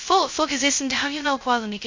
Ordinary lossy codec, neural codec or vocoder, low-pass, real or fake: MP3, 48 kbps; codec, 16 kHz, 0.2 kbps, FocalCodec; 7.2 kHz; fake